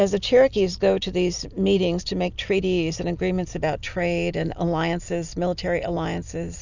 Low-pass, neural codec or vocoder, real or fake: 7.2 kHz; none; real